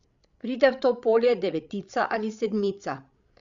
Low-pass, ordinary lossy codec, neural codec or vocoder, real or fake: 7.2 kHz; none; codec, 16 kHz, 16 kbps, FreqCodec, larger model; fake